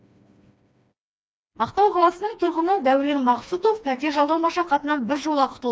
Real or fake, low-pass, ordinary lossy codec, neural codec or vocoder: fake; none; none; codec, 16 kHz, 2 kbps, FreqCodec, smaller model